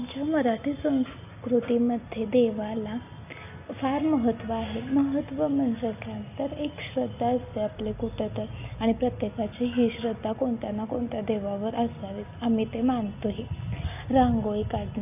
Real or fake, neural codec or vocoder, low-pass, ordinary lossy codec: real; none; 3.6 kHz; AAC, 32 kbps